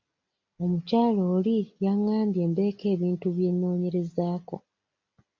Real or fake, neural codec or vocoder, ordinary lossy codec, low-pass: real; none; MP3, 64 kbps; 7.2 kHz